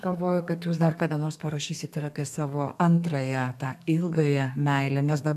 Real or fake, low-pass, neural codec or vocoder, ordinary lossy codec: fake; 14.4 kHz; codec, 32 kHz, 1.9 kbps, SNAC; AAC, 96 kbps